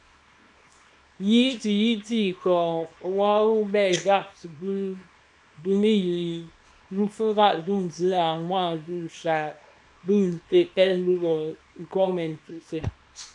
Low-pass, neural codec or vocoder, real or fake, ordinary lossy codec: 10.8 kHz; codec, 24 kHz, 0.9 kbps, WavTokenizer, small release; fake; MP3, 96 kbps